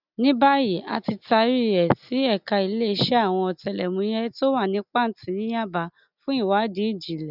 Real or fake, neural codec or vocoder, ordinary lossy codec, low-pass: real; none; none; 5.4 kHz